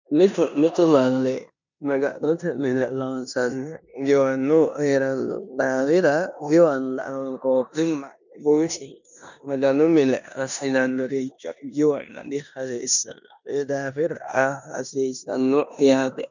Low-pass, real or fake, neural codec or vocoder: 7.2 kHz; fake; codec, 16 kHz in and 24 kHz out, 0.9 kbps, LongCat-Audio-Codec, four codebook decoder